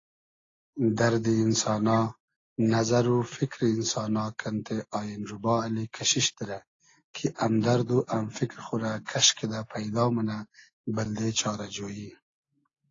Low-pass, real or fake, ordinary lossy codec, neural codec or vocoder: 7.2 kHz; real; AAC, 32 kbps; none